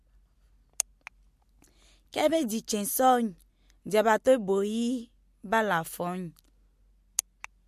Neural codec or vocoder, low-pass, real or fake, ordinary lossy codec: none; 14.4 kHz; real; MP3, 64 kbps